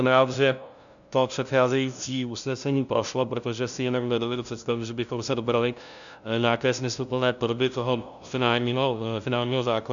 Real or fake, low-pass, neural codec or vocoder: fake; 7.2 kHz; codec, 16 kHz, 0.5 kbps, FunCodec, trained on LibriTTS, 25 frames a second